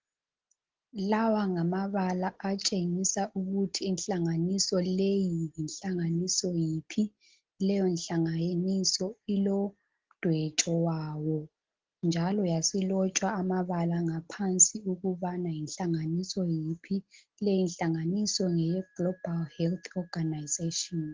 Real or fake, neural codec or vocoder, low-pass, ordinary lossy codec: real; none; 7.2 kHz; Opus, 16 kbps